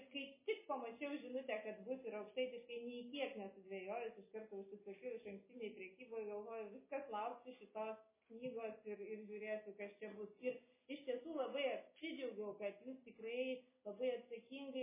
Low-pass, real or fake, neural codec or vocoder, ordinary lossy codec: 3.6 kHz; real; none; MP3, 16 kbps